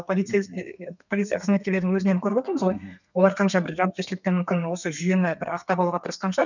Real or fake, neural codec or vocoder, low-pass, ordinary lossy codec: fake; codec, 32 kHz, 1.9 kbps, SNAC; 7.2 kHz; none